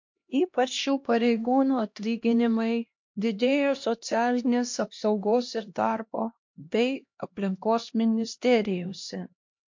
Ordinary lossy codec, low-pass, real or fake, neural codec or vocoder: MP3, 48 kbps; 7.2 kHz; fake; codec, 16 kHz, 1 kbps, X-Codec, HuBERT features, trained on LibriSpeech